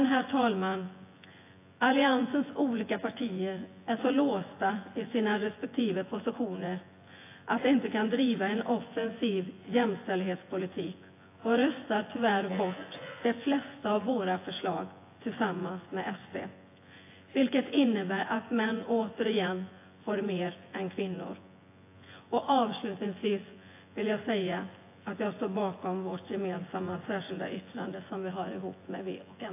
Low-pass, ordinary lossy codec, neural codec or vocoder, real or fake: 3.6 kHz; AAC, 24 kbps; vocoder, 24 kHz, 100 mel bands, Vocos; fake